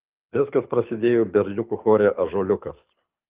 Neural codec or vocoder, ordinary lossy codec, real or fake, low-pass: codec, 24 kHz, 6 kbps, HILCodec; Opus, 24 kbps; fake; 3.6 kHz